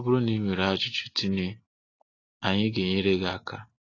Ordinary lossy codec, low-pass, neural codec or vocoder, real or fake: AAC, 32 kbps; 7.2 kHz; none; real